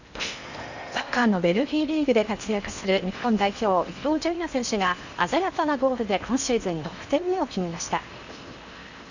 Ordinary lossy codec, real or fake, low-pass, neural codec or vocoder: none; fake; 7.2 kHz; codec, 16 kHz in and 24 kHz out, 0.8 kbps, FocalCodec, streaming, 65536 codes